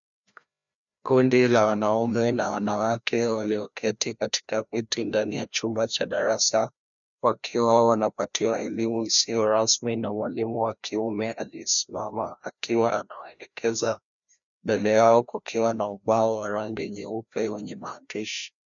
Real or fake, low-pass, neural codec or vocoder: fake; 7.2 kHz; codec, 16 kHz, 1 kbps, FreqCodec, larger model